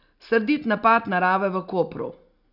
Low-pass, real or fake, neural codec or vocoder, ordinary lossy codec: 5.4 kHz; real; none; none